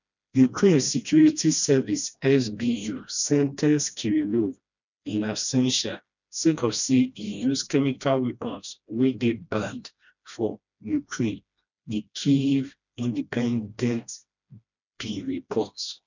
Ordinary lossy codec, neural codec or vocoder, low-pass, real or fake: none; codec, 16 kHz, 1 kbps, FreqCodec, smaller model; 7.2 kHz; fake